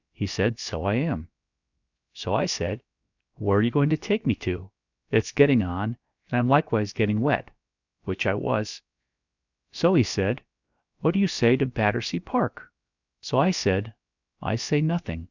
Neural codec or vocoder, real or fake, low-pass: codec, 16 kHz, about 1 kbps, DyCAST, with the encoder's durations; fake; 7.2 kHz